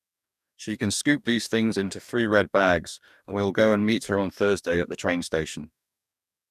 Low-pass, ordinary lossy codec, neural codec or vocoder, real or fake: 14.4 kHz; none; codec, 44.1 kHz, 2.6 kbps, DAC; fake